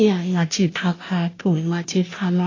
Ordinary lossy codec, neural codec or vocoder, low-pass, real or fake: AAC, 32 kbps; codec, 16 kHz, 0.5 kbps, FunCodec, trained on Chinese and English, 25 frames a second; 7.2 kHz; fake